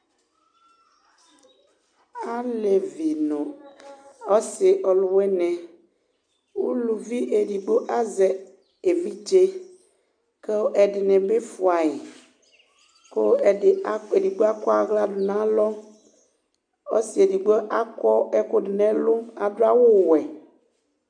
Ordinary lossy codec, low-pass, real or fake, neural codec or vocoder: AAC, 64 kbps; 9.9 kHz; real; none